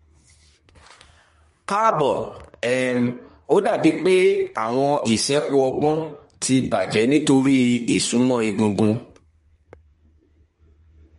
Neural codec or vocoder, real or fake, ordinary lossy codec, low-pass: codec, 24 kHz, 1 kbps, SNAC; fake; MP3, 48 kbps; 10.8 kHz